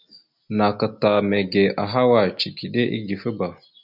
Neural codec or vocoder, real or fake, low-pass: none; real; 5.4 kHz